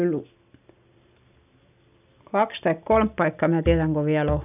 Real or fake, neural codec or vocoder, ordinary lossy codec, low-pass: real; none; none; 3.6 kHz